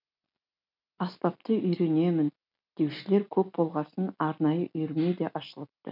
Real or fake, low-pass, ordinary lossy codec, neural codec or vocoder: real; 5.4 kHz; none; none